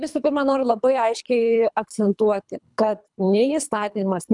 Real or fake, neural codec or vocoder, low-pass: fake; codec, 24 kHz, 3 kbps, HILCodec; 10.8 kHz